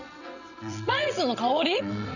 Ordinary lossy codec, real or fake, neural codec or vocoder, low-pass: none; fake; vocoder, 22.05 kHz, 80 mel bands, WaveNeXt; 7.2 kHz